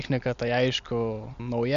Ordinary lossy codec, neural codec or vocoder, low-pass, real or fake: MP3, 64 kbps; none; 7.2 kHz; real